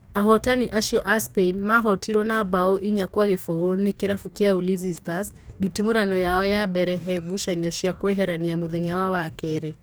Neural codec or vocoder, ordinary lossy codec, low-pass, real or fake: codec, 44.1 kHz, 2.6 kbps, DAC; none; none; fake